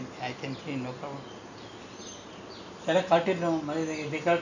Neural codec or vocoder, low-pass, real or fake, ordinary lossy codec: none; 7.2 kHz; real; none